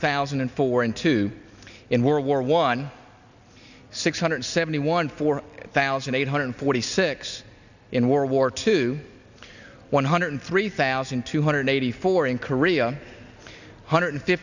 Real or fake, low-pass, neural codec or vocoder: real; 7.2 kHz; none